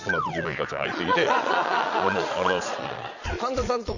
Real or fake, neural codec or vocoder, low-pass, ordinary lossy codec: fake; vocoder, 22.05 kHz, 80 mel bands, Vocos; 7.2 kHz; none